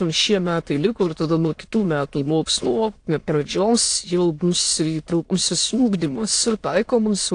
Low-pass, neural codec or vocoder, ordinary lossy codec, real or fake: 9.9 kHz; autoencoder, 22.05 kHz, a latent of 192 numbers a frame, VITS, trained on many speakers; AAC, 48 kbps; fake